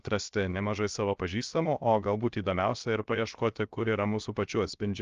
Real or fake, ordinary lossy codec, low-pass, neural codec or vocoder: fake; Opus, 32 kbps; 7.2 kHz; codec, 16 kHz, 0.7 kbps, FocalCodec